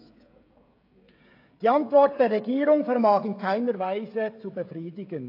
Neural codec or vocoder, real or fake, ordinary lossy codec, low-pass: codec, 16 kHz, 16 kbps, FreqCodec, smaller model; fake; AAC, 32 kbps; 5.4 kHz